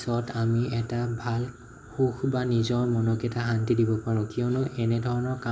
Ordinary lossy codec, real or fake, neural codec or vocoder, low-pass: none; real; none; none